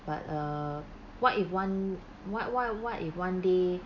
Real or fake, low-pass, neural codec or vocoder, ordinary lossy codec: real; 7.2 kHz; none; none